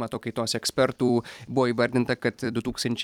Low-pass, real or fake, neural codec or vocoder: 19.8 kHz; fake; vocoder, 44.1 kHz, 128 mel bands every 256 samples, BigVGAN v2